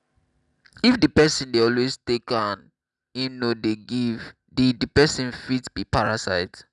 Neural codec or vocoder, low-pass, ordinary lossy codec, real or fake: none; 10.8 kHz; none; real